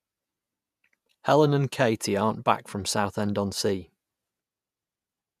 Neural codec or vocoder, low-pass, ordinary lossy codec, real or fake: vocoder, 48 kHz, 128 mel bands, Vocos; 14.4 kHz; none; fake